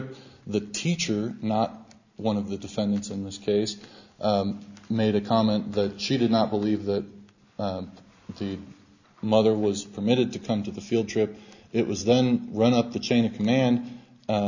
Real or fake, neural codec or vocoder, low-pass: real; none; 7.2 kHz